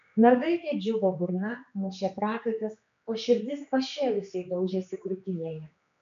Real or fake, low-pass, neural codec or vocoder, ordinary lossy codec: fake; 7.2 kHz; codec, 16 kHz, 2 kbps, X-Codec, HuBERT features, trained on general audio; MP3, 64 kbps